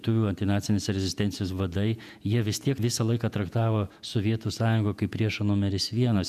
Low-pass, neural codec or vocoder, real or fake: 14.4 kHz; vocoder, 48 kHz, 128 mel bands, Vocos; fake